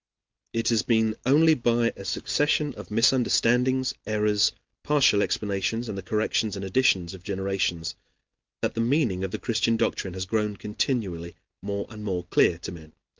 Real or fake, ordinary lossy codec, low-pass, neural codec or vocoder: real; Opus, 24 kbps; 7.2 kHz; none